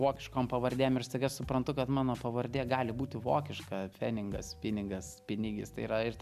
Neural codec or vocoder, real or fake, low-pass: none; real; 14.4 kHz